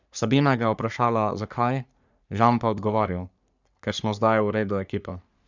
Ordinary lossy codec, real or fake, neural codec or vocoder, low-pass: none; fake; codec, 44.1 kHz, 3.4 kbps, Pupu-Codec; 7.2 kHz